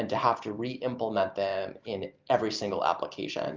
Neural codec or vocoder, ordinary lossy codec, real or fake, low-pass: none; Opus, 32 kbps; real; 7.2 kHz